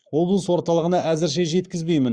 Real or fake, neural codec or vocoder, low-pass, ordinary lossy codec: fake; codec, 44.1 kHz, 7.8 kbps, Pupu-Codec; 9.9 kHz; Opus, 64 kbps